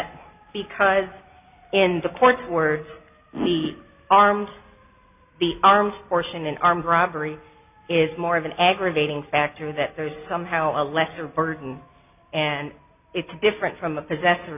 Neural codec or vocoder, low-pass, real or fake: none; 3.6 kHz; real